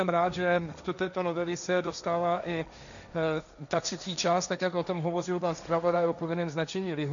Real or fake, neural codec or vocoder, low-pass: fake; codec, 16 kHz, 1.1 kbps, Voila-Tokenizer; 7.2 kHz